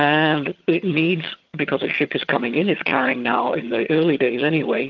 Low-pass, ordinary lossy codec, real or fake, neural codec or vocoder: 7.2 kHz; Opus, 32 kbps; fake; vocoder, 22.05 kHz, 80 mel bands, HiFi-GAN